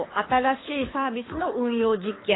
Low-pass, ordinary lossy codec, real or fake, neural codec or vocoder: 7.2 kHz; AAC, 16 kbps; fake; codec, 24 kHz, 6 kbps, HILCodec